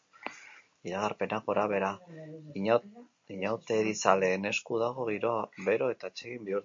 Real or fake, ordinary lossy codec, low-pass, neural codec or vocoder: real; MP3, 48 kbps; 7.2 kHz; none